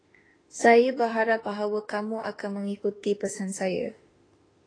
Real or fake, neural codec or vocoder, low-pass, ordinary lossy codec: fake; autoencoder, 48 kHz, 32 numbers a frame, DAC-VAE, trained on Japanese speech; 9.9 kHz; AAC, 32 kbps